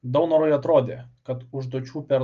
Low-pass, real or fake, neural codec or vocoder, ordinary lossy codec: 7.2 kHz; real; none; Opus, 32 kbps